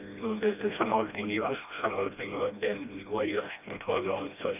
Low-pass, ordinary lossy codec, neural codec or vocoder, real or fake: 3.6 kHz; none; codec, 16 kHz, 1 kbps, FreqCodec, smaller model; fake